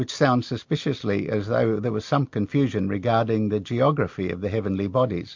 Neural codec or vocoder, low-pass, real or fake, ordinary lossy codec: none; 7.2 kHz; real; MP3, 48 kbps